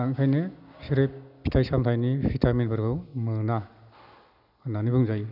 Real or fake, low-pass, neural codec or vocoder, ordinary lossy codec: real; 5.4 kHz; none; none